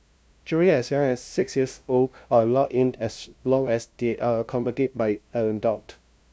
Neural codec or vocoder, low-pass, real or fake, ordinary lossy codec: codec, 16 kHz, 0.5 kbps, FunCodec, trained on LibriTTS, 25 frames a second; none; fake; none